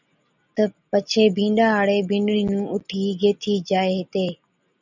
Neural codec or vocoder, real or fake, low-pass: none; real; 7.2 kHz